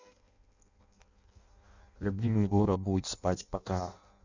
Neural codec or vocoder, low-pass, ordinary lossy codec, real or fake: codec, 16 kHz in and 24 kHz out, 0.6 kbps, FireRedTTS-2 codec; 7.2 kHz; Opus, 64 kbps; fake